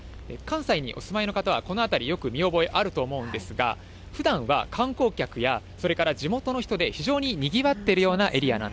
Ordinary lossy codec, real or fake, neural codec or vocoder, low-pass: none; real; none; none